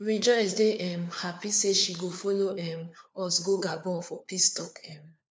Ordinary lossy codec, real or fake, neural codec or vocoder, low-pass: none; fake; codec, 16 kHz, 4 kbps, FunCodec, trained on LibriTTS, 50 frames a second; none